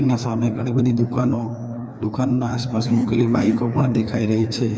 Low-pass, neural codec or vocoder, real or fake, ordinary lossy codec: none; codec, 16 kHz, 2 kbps, FreqCodec, larger model; fake; none